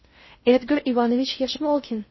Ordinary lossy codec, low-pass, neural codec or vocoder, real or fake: MP3, 24 kbps; 7.2 kHz; codec, 16 kHz in and 24 kHz out, 0.6 kbps, FocalCodec, streaming, 2048 codes; fake